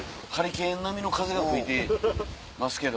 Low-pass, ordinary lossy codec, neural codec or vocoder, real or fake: none; none; none; real